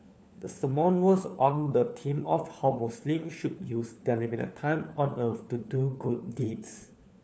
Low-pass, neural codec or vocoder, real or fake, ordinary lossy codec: none; codec, 16 kHz, 4 kbps, FunCodec, trained on LibriTTS, 50 frames a second; fake; none